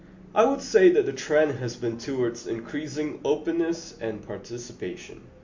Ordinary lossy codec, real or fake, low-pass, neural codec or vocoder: MP3, 48 kbps; real; 7.2 kHz; none